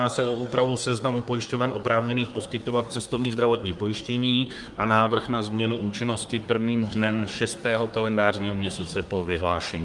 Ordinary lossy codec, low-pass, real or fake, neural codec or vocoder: Opus, 32 kbps; 10.8 kHz; fake; codec, 24 kHz, 1 kbps, SNAC